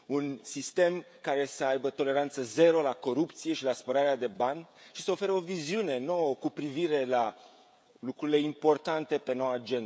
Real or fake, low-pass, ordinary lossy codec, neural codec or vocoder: fake; none; none; codec, 16 kHz, 16 kbps, FreqCodec, smaller model